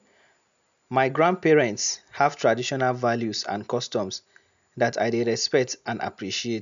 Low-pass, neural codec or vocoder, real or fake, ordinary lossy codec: 7.2 kHz; none; real; none